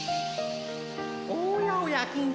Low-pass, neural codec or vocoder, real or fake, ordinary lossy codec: none; none; real; none